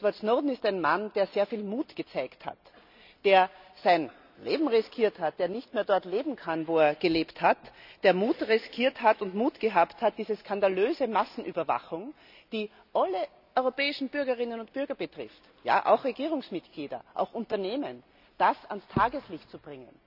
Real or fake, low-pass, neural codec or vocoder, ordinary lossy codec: real; 5.4 kHz; none; none